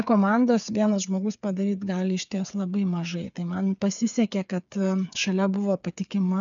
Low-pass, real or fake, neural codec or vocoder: 7.2 kHz; fake; codec, 16 kHz, 8 kbps, FreqCodec, smaller model